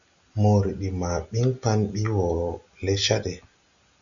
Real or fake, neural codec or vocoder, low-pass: real; none; 7.2 kHz